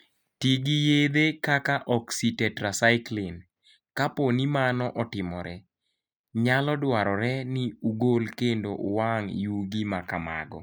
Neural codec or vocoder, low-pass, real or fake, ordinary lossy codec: none; none; real; none